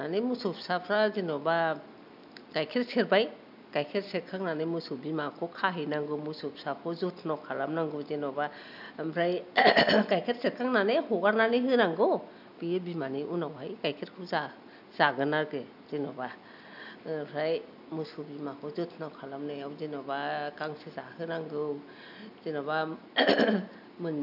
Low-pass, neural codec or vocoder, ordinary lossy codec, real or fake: 5.4 kHz; none; none; real